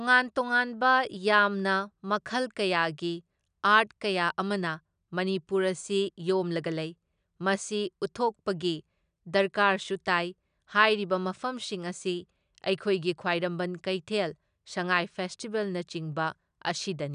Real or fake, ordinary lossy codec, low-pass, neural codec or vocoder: real; none; 9.9 kHz; none